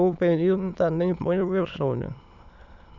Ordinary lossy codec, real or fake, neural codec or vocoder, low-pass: none; fake; autoencoder, 22.05 kHz, a latent of 192 numbers a frame, VITS, trained on many speakers; 7.2 kHz